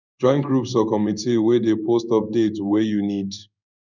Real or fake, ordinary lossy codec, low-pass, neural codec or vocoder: fake; none; 7.2 kHz; codec, 16 kHz in and 24 kHz out, 1 kbps, XY-Tokenizer